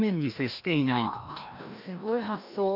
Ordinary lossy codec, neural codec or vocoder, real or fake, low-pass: none; codec, 16 kHz, 1 kbps, FreqCodec, larger model; fake; 5.4 kHz